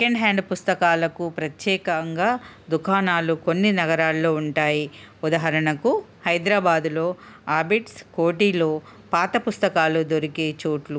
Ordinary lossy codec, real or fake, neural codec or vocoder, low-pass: none; real; none; none